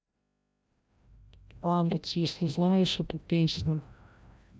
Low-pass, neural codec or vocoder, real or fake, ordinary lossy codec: none; codec, 16 kHz, 0.5 kbps, FreqCodec, larger model; fake; none